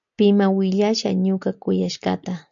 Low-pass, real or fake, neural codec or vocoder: 7.2 kHz; real; none